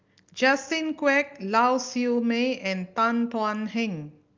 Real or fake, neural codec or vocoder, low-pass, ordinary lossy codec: real; none; 7.2 kHz; Opus, 24 kbps